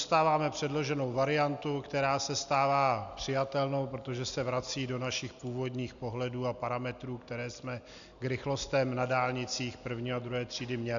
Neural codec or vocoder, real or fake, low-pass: none; real; 7.2 kHz